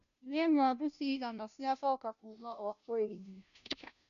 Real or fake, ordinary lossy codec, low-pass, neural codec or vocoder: fake; none; 7.2 kHz; codec, 16 kHz, 0.5 kbps, FunCodec, trained on Chinese and English, 25 frames a second